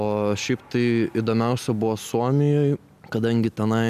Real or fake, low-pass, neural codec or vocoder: real; 14.4 kHz; none